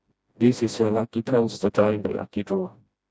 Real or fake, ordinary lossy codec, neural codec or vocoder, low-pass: fake; none; codec, 16 kHz, 1 kbps, FreqCodec, smaller model; none